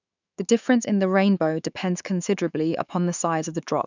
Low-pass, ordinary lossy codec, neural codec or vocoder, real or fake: 7.2 kHz; none; autoencoder, 48 kHz, 128 numbers a frame, DAC-VAE, trained on Japanese speech; fake